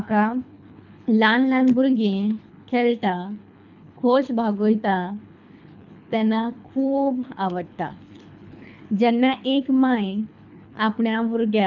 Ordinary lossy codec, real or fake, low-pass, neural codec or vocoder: none; fake; 7.2 kHz; codec, 24 kHz, 3 kbps, HILCodec